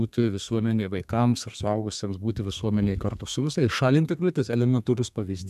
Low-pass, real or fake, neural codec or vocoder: 14.4 kHz; fake; codec, 32 kHz, 1.9 kbps, SNAC